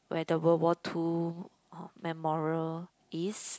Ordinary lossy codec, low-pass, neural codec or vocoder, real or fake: none; none; none; real